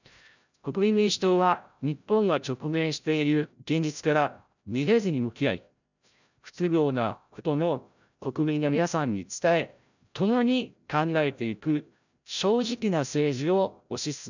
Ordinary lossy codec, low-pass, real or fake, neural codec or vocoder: none; 7.2 kHz; fake; codec, 16 kHz, 0.5 kbps, FreqCodec, larger model